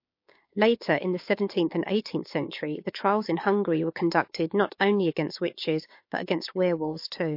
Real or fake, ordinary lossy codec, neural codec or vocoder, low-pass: fake; MP3, 32 kbps; codec, 16 kHz, 6 kbps, DAC; 5.4 kHz